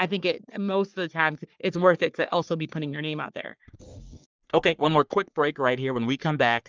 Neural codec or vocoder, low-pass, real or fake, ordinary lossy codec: codec, 44.1 kHz, 3.4 kbps, Pupu-Codec; 7.2 kHz; fake; Opus, 24 kbps